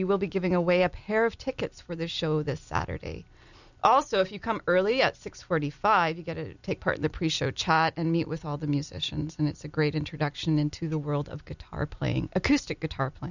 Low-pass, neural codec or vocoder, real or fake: 7.2 kHz; none; real